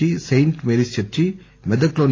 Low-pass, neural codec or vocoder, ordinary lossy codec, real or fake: 7.2 kHz; none; AAC, 32 kbps; real